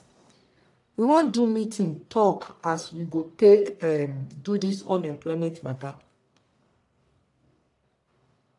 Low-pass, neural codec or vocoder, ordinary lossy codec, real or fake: 10.8 kHz; codec, 44.1 kHz, 1.7 kbps, Pupu-Codec; AAC, 64 kbps; fake